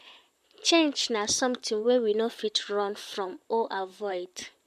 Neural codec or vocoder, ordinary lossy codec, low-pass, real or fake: vocoder, 44.1 kHz, 128 mel bands, Pupu-Vocoder; MP3, 64 kbps; 14.4 kHz; fake